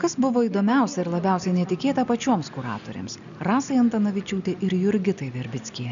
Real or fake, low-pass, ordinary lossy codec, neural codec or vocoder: real; 7.2 kHz; MP3, 96 kbps; none